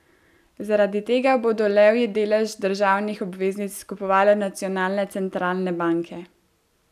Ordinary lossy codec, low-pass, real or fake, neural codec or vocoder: none; 14.4 kHz; real; none